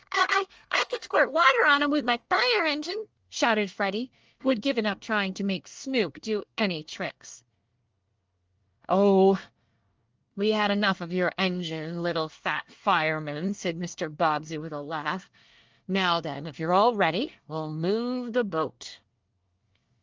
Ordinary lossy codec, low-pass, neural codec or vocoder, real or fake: Opus, 24 kbps; 7.2 kHz; codec, 24 kHz, 1 kbps, SNAC; fake